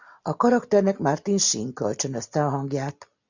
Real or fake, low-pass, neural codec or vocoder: real; 7.2 kHz; none